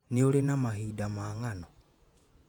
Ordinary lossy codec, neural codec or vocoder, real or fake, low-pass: none; vocoder, 48 kHz, 128 mel bands, Vocos; fake; 19.8 kHz